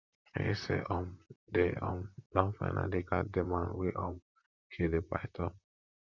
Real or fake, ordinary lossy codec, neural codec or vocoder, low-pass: fake; Opus, 64 kbps; vocoder, 44.1 kHz, 128 mel bands, Pupu-Vocoder; 7.2 kHz